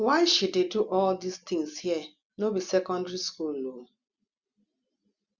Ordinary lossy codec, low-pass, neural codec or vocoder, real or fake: Opus, 64 kbps; 7.2 kHz; none; real